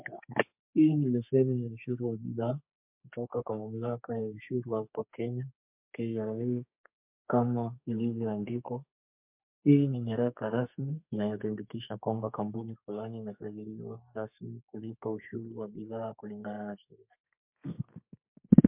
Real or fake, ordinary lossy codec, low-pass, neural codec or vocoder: fake; MP3, 32 kbps; 3.6 kHz; codec, 32 kHz, 1.9 kbps, SNAC